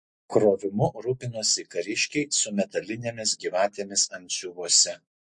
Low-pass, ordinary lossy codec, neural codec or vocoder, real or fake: 10.8 kHz; MP3, 48 kbps; none; real